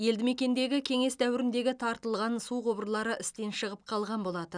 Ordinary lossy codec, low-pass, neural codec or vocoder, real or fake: none; 9.9 kHz; none; real